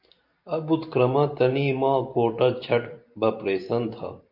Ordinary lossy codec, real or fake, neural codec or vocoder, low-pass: MP3, 48 kbps; fake; vocoder, 44.1 kHz, 128 mel bands every 512 samples, BigVGAN v2; 5.4 kHz